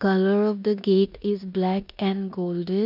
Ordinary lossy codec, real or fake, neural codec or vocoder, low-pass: none; fake; codec, 16 kHz in and 24 kHz out, 0.9 kbps, LongCat-Audio-Codec, four codebook decoder; 5.4 kHz